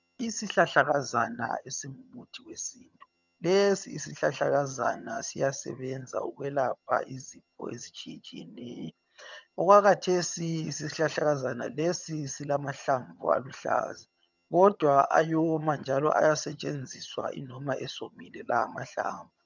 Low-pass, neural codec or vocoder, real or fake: 7.2 kHz; vocoder, 22.05 kHz, 80 mel bands, HiFi-GAN; fake